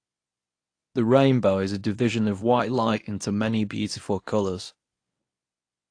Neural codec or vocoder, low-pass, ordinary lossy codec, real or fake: codec, 24 kHz, 0.9 kbps, WavTokenizer, medium speech release version 1; 9.9 kHz; AAC, 48 kbps; fake